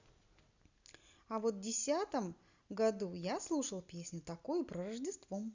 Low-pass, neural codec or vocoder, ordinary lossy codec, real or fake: 7.2 kHz; none; Opus, 64 kbps; real